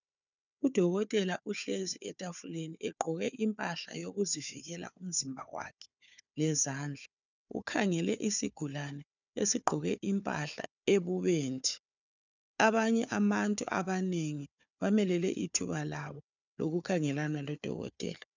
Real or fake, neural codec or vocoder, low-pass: fake; codec, 16 kHz, 4 kbps, FunCodec, trained on Chinese and English, 50 frames a second; 7.2 kHz